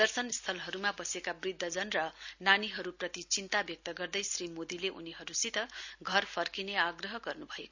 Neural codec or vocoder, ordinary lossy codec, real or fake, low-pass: none; Opus, 64 kbps; real; 7.2 kHz